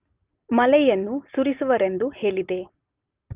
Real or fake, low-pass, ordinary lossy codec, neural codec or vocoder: real; 3.6 kHz; Opus, 32 kbps; none